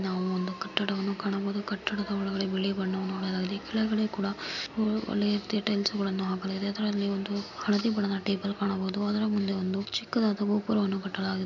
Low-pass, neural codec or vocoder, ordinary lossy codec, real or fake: 7.2 kHz; none; MP3, 48 kbps; real